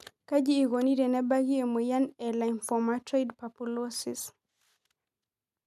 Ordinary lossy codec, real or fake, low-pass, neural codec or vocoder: none; real; 14.4 kHz; none